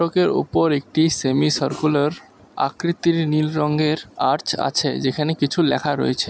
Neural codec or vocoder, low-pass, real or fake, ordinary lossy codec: none; none; real; none